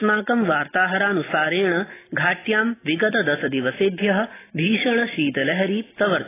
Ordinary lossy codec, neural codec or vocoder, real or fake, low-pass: AAC, 16 kbps; none; real; 3.6 kHz